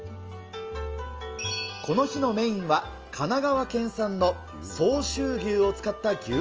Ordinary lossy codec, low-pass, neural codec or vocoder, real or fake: Opus, 24 kbps; 7.2 kHz; none; real